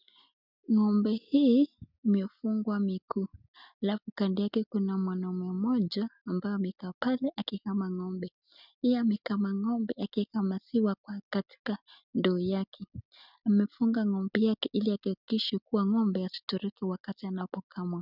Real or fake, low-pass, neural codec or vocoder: real; 5.4 kHz; none